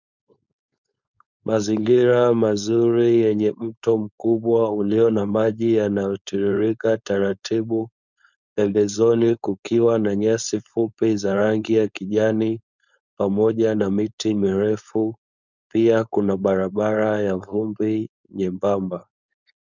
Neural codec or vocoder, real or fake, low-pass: codec, 16 kHz, 4.8 kbps, FACodec; fake; 7.2 kHz